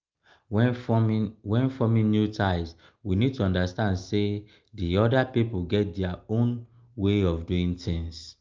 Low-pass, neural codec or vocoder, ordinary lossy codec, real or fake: 7.2 kHz; none; Opus, 32 kbps; real